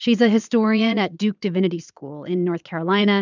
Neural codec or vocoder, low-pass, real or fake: vocoder, 22.05 kHz, 80 mel bands, Vocos; 7.2 kHz; fake